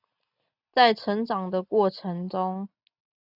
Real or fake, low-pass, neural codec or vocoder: real; 5.4 kHz; none